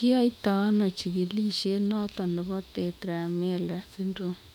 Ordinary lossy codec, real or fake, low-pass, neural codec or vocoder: none; fake; 19.8 kHz; autoencoder, 48 kHz, 32 numbers a frame, DAC-VAE, trained on Japanese speech